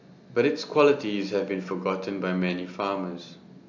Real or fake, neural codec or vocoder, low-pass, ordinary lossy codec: real; none; 7.2 kHz; AAC, 48 kbps